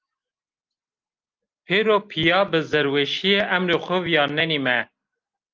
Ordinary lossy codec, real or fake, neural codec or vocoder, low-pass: Opus, 24 kbps; real; none; 7.2 kHz